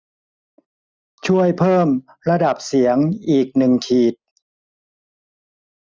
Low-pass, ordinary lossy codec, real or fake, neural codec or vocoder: none; none; real; none